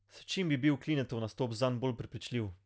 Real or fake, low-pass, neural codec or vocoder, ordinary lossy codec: real; none; none; none